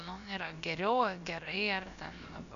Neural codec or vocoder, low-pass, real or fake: codec, 16 kHz, about 1 kbps, DyCAST, with the encoder's durations; 7.2 kHz; fake